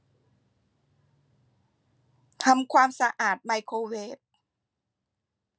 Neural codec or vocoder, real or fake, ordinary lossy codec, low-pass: none; real; none; none